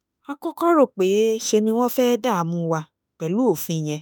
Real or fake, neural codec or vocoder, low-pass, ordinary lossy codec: fake; autoencoder, 48 kHz, 32 numbers a frame, DAC-VAE, trained on Japanese speech; none; none